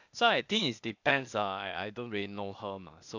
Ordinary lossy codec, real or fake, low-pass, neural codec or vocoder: none; fake; 7.2 kHz; codec, 16 kHz, 0.8 kbps, ZipCodec